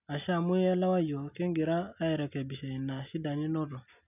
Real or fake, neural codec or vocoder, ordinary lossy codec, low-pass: real; none; none; 3.6 kHz